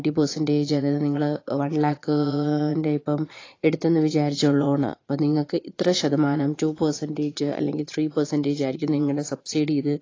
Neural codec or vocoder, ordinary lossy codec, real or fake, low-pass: vocoder, 22.05 kHz, 80 mel bands, Vocos; AAC, 32 kbps; fake; 7.2 kHz